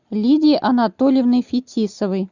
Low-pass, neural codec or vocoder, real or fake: 7.2 kHz; none; real